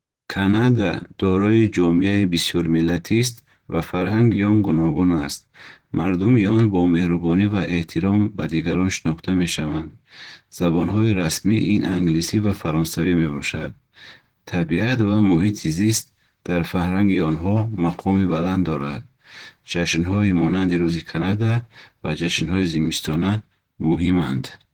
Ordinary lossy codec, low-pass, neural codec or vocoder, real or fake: Opus, 16 kbps; 19.8 kHz; vocoder, 44.1 kHz, 128 mel bands, Pupu-Vocoder; fake